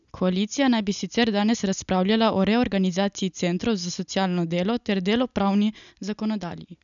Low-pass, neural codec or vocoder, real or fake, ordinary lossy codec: 7.2 kHz; none; real; none